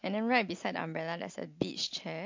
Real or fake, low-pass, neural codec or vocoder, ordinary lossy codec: real; 7.2 kHz; none; MP3, 48 kbps